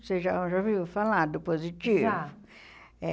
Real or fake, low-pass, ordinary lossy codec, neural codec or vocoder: real; none; none; none